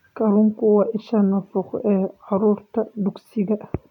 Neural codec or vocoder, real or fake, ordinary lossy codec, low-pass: none; real; none; 19.8 kHz